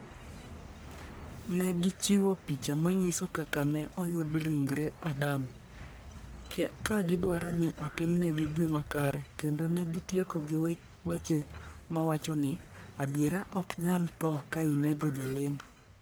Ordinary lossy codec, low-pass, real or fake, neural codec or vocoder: none; none; fake; codec, 44.1 kHz, 1.7 kbps, Pupu-Codec